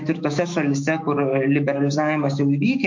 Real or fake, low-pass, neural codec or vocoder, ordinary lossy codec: fake; 7.2 kHz; autoencoder, 48 kHz, 128 numbers a frame, DAC-VAE, trained on Japanese speech; MP3, 48 kbps